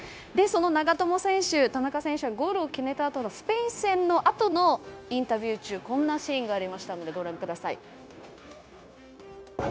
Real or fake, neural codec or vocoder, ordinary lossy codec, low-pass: fake; codec, 16 kHz, 0.9 kbps, LongCat-Audio-Codec; none; none